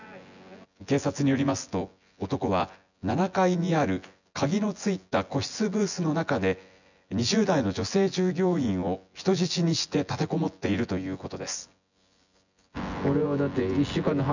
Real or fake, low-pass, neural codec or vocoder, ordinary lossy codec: fake; 7.2 kHz; vocoder, 24 kHz, 100 mel bands, Vocos; none